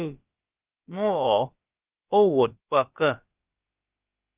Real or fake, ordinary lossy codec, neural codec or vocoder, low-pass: fake; Opus, 32 kbps; codec, 16 kHz, about 1 kbps, DyCAST, with the encoder's durations; 3.6 kHz